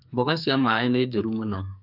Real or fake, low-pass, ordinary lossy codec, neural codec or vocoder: fake; 5.4 kHz; none; codec, 44.1 kHz, 2.6 kbps, SNAC